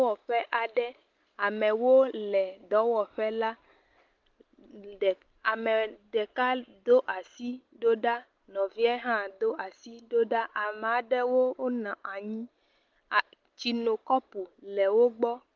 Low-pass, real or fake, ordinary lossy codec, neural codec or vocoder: 7.2 kHz; real; Opus, 32 kbps; none